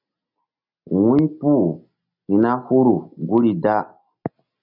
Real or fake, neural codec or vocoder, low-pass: real; none; 5.4 kHz